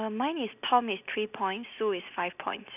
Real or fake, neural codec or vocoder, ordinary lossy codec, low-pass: real; none; none; 3.6 kHz